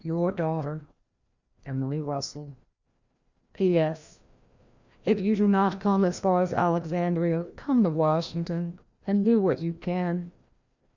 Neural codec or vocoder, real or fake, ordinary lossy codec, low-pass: codec, 16 kHz, 1 kbps, FreqCodec, larger model; fake; Opus, 64 kbps; 7.2 kHz